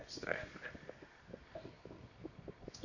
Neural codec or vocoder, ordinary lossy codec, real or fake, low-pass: codec, 24 kHz, 0.9 kbps, WavTokenizer, medium music audio release; none; fake; 7.2 kHz